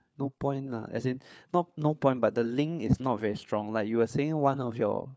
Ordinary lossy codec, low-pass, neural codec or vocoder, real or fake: none; none; codec, 16 kHz, 4 kbps, FunCodec, trained on LibriTTS, 50 frames a second; fake